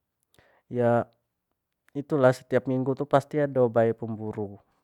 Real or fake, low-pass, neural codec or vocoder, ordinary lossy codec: real; 19.8 kHz; none; none